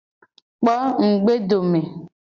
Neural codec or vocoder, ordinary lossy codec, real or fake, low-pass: none; Opus, 64 kbps; real; 7.2 kHz